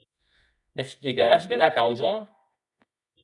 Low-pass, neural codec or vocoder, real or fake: 10.8 kHz; codec, 24 kHz, 0.9 kbps, WavTokenizer, medium music audio release; fake